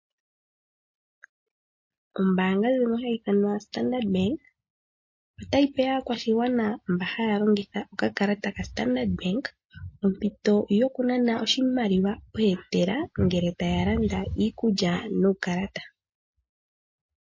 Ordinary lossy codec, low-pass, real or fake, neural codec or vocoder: MP3, 32 kbps; 7.2 kHz; real; none